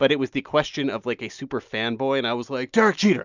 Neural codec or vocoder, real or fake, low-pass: none; real; 7.2 kHz